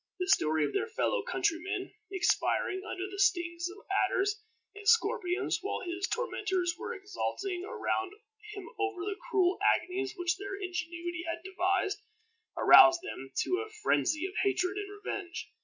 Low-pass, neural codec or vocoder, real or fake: 7.2 kHz; none; real